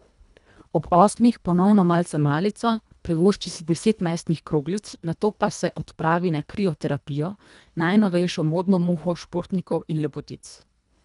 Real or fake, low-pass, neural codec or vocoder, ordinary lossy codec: fake; 10.8 kHz; codec, 24 kHz, 1.5 kbps, HILCodec; none